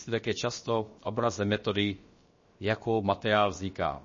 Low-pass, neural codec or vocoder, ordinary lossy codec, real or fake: 7.2 kHz; codec, 16 kHz, about 1 kbps, DyCAST, with the encoder's durations; MP3, 32 kbps; fake